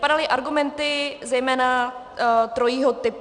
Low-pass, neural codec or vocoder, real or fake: 9.9 kHz; none; real